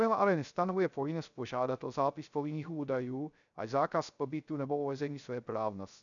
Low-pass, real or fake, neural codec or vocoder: 7.2 kHz; fake; codec, 16 kHz, 0.3 kbps, FocalCodec